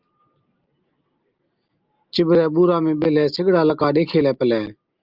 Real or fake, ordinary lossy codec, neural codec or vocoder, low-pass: real; Opus, 24 kbps; none; 5.4 kHz